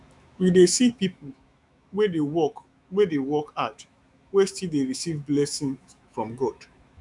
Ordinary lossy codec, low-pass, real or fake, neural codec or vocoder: none; 10.8 kHz; fake; autoencoder, 48 kHz, 128 numbers a frame, DAC-VAE, trained on Japanese speech